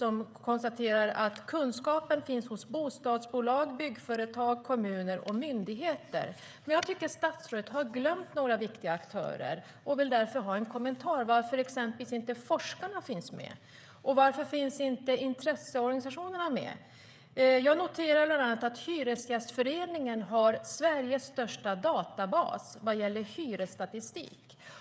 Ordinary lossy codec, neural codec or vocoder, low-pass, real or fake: none; codec, 16 kHz, 16 kbps, FreqCodec, smaller model; none; fake